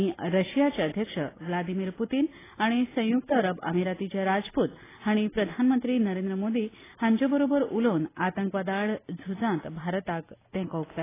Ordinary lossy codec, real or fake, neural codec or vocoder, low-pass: AAC, 16 kbps; real; none; 3.6 kHz